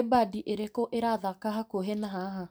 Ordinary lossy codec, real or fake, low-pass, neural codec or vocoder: none; real; none; none